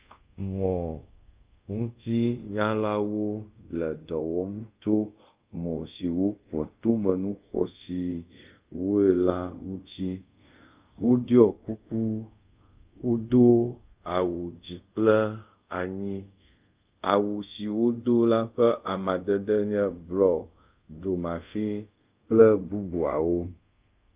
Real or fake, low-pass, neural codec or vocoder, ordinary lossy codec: fake; 3.6 kHz; codec, 24 kHz, 0.5 kbps, DualCodec; Opus, 24 kbps